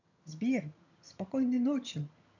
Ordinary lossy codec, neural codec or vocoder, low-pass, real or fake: none; vocoder, 22.05 kHz, 80 mel bands, HiFi-GAN; 7.2 kHz; fake